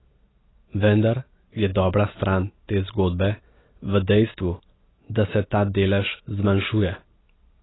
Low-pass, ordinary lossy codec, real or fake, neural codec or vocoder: 7.2 kHz; AAC, 16 kbps; real; none